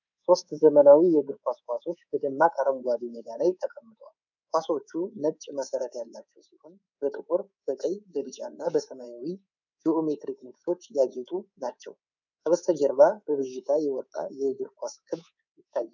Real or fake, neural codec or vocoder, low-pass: fake; codec, 24 kHz, 3.1 kbps, DualCodec; 7.2 kHz